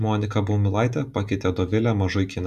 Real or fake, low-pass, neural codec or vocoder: real; 14.4 kHz; none